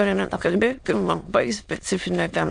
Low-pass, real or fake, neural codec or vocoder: 9.9 kHz; fake; autoencoder, 22.05 kHz, a latent of 192 numbers a frame, VITS, trained on many speakers